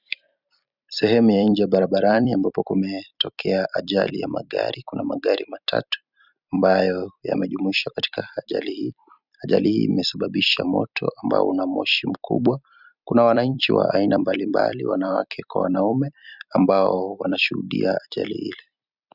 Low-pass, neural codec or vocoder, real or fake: 5.4 kHz; vocoder, 44.1 kHz, 128 mel bands every 256 samples, BigVGAN v2; fake